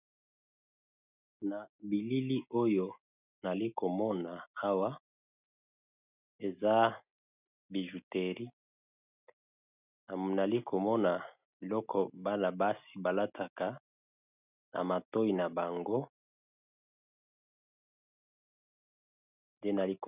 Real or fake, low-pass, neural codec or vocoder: real; 3.6 kHz; none